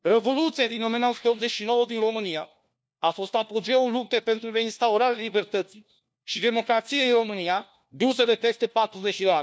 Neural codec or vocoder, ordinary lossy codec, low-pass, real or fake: codec, 16 kHz, 1 kbps, FunCodec, trained on LibriTTS, 50 frames a second; none; none; fake